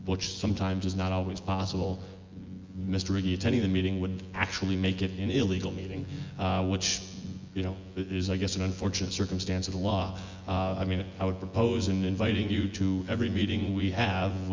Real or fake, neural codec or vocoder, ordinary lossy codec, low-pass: fake; vocoder, 24 kHz, 100 mel bands, Vocos; Opus, 32 kbps; 7.2 kHz